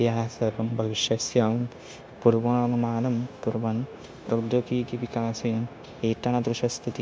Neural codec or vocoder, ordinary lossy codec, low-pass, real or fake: codec, 16 kHz, 0.9 kbps, LongCat-Audio-Codec; none; none; fake